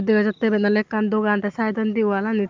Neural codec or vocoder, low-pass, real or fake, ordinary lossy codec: none; 7.2 kHz; real; Opus, 32 kbps